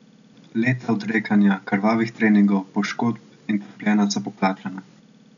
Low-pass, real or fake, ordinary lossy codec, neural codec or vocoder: 7.2 kHz; real; none; none